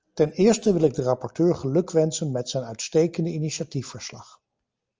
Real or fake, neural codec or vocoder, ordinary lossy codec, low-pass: real; none; Opus, 24 kbps; 7.2 kHz